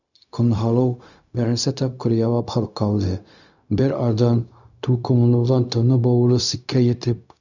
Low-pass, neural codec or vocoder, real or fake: 7.2 kHz; codec, 16 kHz, 0.4 kbps, LongCat-Audio-Codec; fake